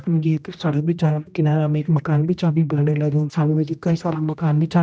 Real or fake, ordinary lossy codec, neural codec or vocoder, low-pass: fake; none; codec, 16 kHz, 1 kbps, X-Codec, HuBERT features, trained on general audio; none